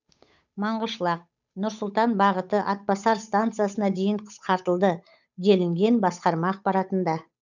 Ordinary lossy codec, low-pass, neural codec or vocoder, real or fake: none; 7.2 kHz; codec, 16 kHz, 8 kbps, FunCodec, trained on Chinese and English, 25 frames a second; fake